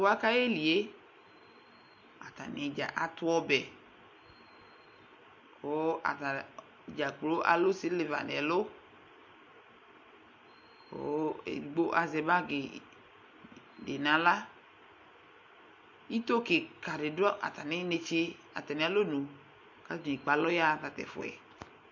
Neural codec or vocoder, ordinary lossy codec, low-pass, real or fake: none; MP3, 48 kbps; 7.2 kHz; real